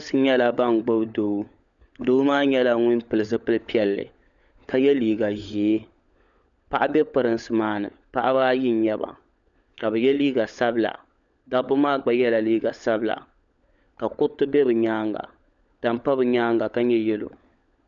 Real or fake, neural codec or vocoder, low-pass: fake; codec, 16 kHz, 16 kbps, FunCodec, trained on LibriTTS, 50 frames a second; 7.2 kHz